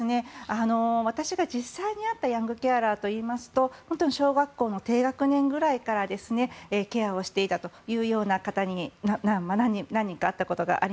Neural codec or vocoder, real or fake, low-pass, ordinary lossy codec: none; real; none; none